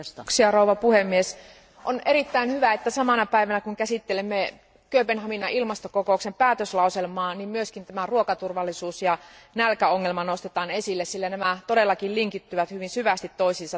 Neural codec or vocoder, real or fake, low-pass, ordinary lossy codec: none; real; none; none